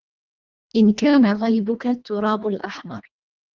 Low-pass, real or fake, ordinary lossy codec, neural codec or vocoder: 7.2 kHz; fake; Opus, 32 kbps; codec, 24 kHz, 1.5 kbps, HILCodec